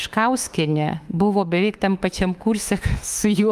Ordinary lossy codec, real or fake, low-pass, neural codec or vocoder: Opus, 32 kbps; fake; 14.4 kHz; autoencoder, 48 kHz, 32 numbers a frame, DAC-VAE, trained on Japanese speech